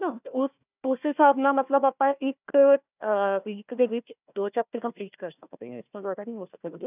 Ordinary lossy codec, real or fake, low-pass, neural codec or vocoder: none; fake; 3.6 kHz; codec, 16 kHz, 1 kbps, FunCodec, trained on Chinese and English, 50 frames a second